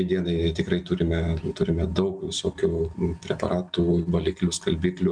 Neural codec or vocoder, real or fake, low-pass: none; real; 9.9 kHz